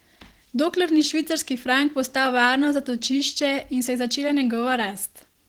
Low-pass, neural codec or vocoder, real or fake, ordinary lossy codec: 19.8 kHz; vocoder, 44.1 kHz, 128 mel bands every 512 samples, BigVGAN v2; fake; Opus, 16 kbps